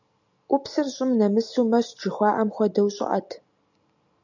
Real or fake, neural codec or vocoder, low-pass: real; none; 7.2 kHz